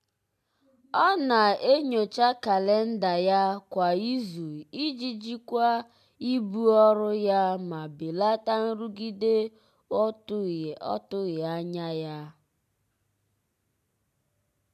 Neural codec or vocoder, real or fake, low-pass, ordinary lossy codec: none; real; 14.4 kHz; MP3, 96 kbps